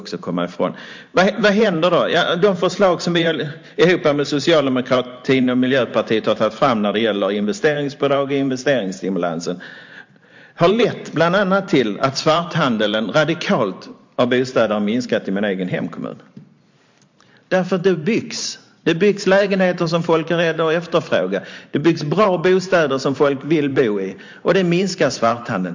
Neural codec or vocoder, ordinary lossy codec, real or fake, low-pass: vocoder, 44.1 kHz, 128 mel bands every 512 samples, BigVGAN v2; MP3, 48 kbps; fake; 7.2 kHz